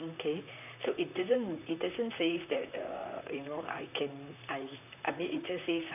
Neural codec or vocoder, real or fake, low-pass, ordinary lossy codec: vocoder, 44.1 kHz, 128 mel bands, Pupu-Vocoder; fake; 3.6 kHz; none